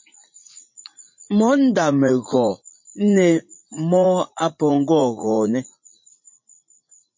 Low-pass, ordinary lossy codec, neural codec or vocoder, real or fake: 7.2 kHz; MP3, 32 kbps; vocoder, 44.1 kHz, 80 mel bands, Vocos; fake